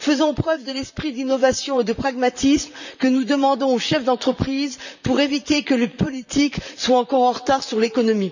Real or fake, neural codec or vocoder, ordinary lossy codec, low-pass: fake; vocoder, 44.1 kHz, 128 mel bands, Pupu-Vocoder; none; 7.2 kHz